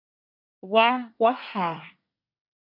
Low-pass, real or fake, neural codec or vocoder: 5.4 kHz; fake; codec, 24 kHz, 1 kbps, SNAC